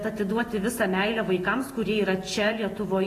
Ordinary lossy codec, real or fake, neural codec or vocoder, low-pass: AAC, 48 kbps; fake; vocoder, 48 kHz, 128 mel bands, Vocos; 14.4 kHz